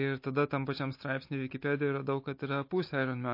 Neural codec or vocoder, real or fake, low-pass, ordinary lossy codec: none; real; 5.4 kHz; MP3, 32 kbps